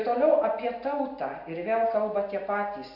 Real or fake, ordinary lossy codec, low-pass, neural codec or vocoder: real; Opus, 64 kbps; 5.4 kHz; none